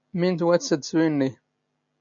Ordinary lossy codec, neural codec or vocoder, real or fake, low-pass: AAC, 64 kbps; none; real; 7.2 kHz